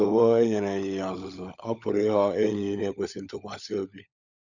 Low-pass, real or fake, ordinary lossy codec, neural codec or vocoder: 7.2 kHz; fake; none; codec, 16 kHz, 16 kbps, FunCodec, trained on LibriTTS, 50 frames a second